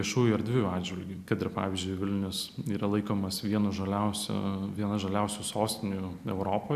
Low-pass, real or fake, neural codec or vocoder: 14.4 kHz; real; none